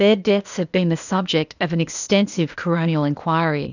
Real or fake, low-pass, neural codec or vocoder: fake; 7.2 kHz; codec, 16 kHz, 0.8 kbps, ZipCodec